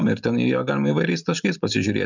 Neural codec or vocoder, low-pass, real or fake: none; 7.2 kHz; real